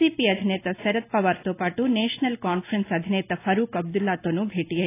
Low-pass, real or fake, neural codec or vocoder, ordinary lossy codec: 3.6 kHz; real; none; AAC, 24 kbps